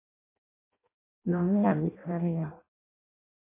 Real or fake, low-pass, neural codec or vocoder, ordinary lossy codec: fake; 3.6 kHz; codec, 16 kHz in and 24 kHz out, 0.6 kbps, FireRedTTS-2 codec; MP3, 24 kbps